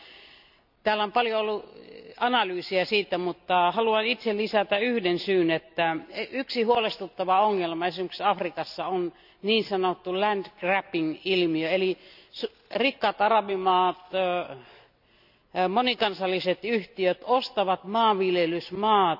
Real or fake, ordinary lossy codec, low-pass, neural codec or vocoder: real; none; 5.4 kHz; none